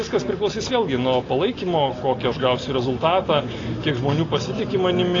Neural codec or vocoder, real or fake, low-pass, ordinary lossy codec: none; real; 7.2 kHz; AAC, 32 kbps